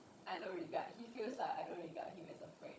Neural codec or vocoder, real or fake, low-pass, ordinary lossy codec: codec, 16 kHz, 16 kbps, FunCodec, trained on Chinese and English, 50 frames a second; fake; none; none